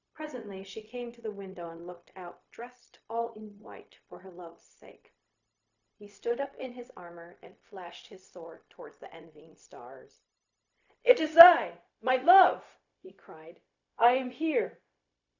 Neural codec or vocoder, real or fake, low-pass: codec, 16 kHz, 0.4 kbps, LongCat-Audio-Codec; fake; 7.2 kHz